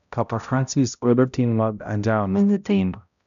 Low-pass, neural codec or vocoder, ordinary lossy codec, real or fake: 7.2 kHz; codec, 16 kHz, 0.5 kbps, X-Codec, HuBERT features, trained on balanced general audio; none; fake